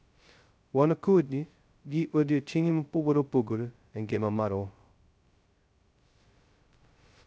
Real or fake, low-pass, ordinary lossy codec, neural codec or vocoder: fake; none; none; codec, 16 kHz, 0.2 kbps, FocalCodec